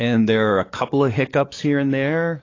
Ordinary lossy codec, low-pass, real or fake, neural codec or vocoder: AAC, 32 kbps; 7.2 kHz; fake; codec, 44.1 kHz, 7.8 kbps, DAC